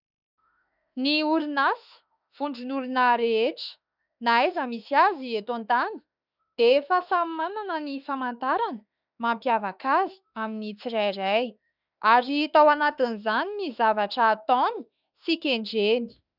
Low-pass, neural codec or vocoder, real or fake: 5.4 kHz; autoencoder, 48 kHz, 32 numbers a frame, DAC-VAE, trained on Japanese speech; fake